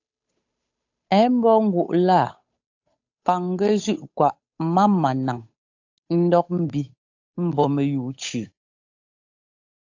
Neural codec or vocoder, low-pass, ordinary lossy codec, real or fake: codec, 16 kHz, 8 kbps, FunCodec, trained on Chinese and English, 25 frames a second; 7.2 kHz; AAC, 48 kbps; fake